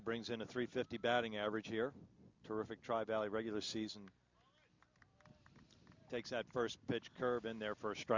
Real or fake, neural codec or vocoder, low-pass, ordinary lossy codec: real; none; 7.2 kHz; MP3, 64 kbps